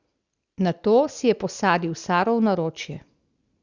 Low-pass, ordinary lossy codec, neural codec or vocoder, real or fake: 7.2 kHz; Opus, 64 kbps; none; real